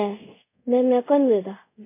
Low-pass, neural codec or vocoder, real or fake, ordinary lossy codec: 3.6 kHz; codec, 24 kHz, 0.5 kbps, DualCodec; fake; MP3, 24 kbps